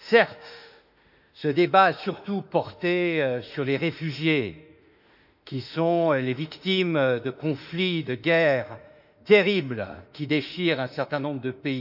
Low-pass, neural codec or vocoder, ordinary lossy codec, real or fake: 5.4 kHz; autoencoder, 48 kHz, 32 numbers a frame, DAC-VAE, trained on Japanese speech; none; fake